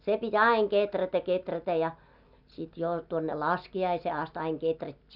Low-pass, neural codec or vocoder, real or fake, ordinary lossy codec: 5.4 kHz; none; real; none